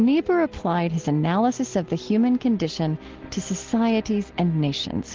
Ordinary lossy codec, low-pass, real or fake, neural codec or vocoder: Opus, 16 kbps; 7.2 kHz; real; none